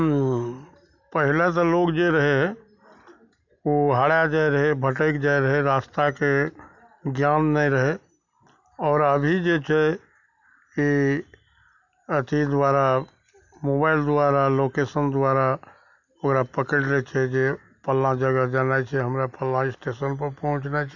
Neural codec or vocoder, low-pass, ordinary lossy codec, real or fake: none; 7.2 kHz; none; real